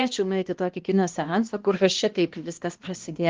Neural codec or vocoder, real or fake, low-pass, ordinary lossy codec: codec, 16 kHz, 1 kbps, X-Codec, HuBERT features, trained on balanced general audio; fake; 7.2 kHz; Opus, 24 kbps